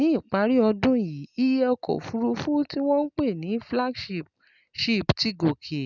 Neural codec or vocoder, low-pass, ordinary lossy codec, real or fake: none; 7.2 kHz; none; real